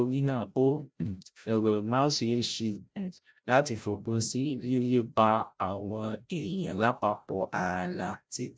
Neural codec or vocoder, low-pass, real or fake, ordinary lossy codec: codec, 16 kHz, 0.5 kbps, FreqCodec, larger model; none; fake; none